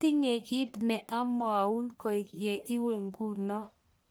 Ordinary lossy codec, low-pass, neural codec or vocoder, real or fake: none; none; codec, 44.1 kHz, 1.7 kbps, Pupu-Codec; fake